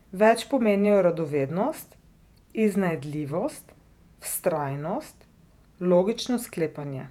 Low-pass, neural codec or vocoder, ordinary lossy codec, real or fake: 19.8 kHz; vocoder, 48 kHz, 128 mel bands, Vocos; none; fake